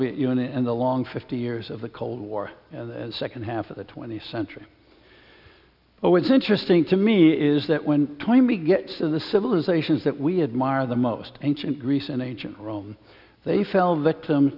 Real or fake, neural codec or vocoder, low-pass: real; none; 5.4 kHz